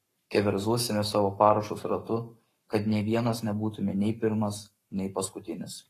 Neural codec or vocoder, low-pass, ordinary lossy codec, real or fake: codec, 44.1 kHz, 7.8 kbps, Pupu-Codec; 14.4 kHz; AAC, 48 kbps; fake